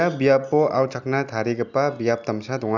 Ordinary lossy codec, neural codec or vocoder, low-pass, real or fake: none; none; 7.2 kHz; real